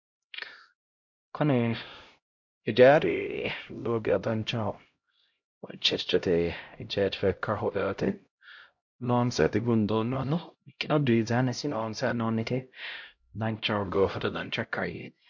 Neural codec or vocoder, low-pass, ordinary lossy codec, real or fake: codec, 16 kHz, 0.5 kbps, X-Codec, HuBERT features, trained on LibriSpeech; 7.2 kHz; MP3, 48 kbps; fake